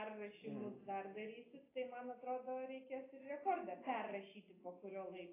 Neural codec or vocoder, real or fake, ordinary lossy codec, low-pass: none; real; AAC, 16 kbps; 3.6 kHz